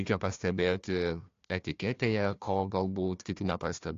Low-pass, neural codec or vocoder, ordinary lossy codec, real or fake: 7.2 kHz; codec, 16 kHz, 1 kbps, FunCodec, trained on Chinese and English, 50 frames a second; AAC, 48 kbps; fake